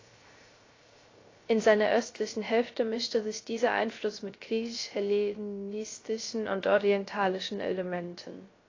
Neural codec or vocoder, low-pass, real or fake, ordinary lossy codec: codec, 16 kHz, 0.3 kbps, FocalCodec; 7.2 kHz; fake; AAC, 32 kbps